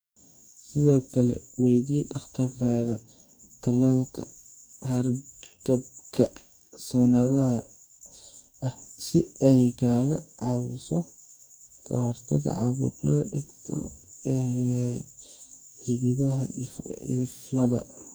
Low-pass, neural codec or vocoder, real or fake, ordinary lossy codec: none; codec, 44.1 kHz, 2.6 kbps, DAC; fake; none